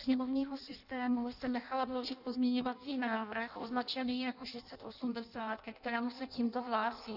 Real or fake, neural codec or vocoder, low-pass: fake; codec, 16 kHz in and 24 kHz out, 0.6 kbps, FireRedTTS-2 codec; 5.4 kHz